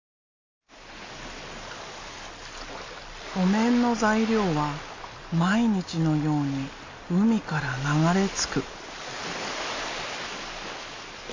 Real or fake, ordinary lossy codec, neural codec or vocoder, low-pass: real; AAC, 32 kbps; none; 7.2 kHz